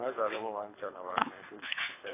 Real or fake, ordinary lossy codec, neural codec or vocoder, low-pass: fake; AAC, 16 kbps; codec, 24 kHz, 3 kbps, HILCodec; 3.6 kHz